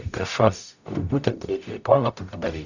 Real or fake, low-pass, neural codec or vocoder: fake; 7.2 kHz; codec, 44.1 kHz, 0.9 kbps, DAC